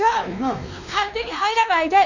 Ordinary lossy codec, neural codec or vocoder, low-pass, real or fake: none; codec, 16 kHz, 2 kbps, X-Codec, WavLM features, trained on Multilingual LibriSpeech; 7.2 kHz; fake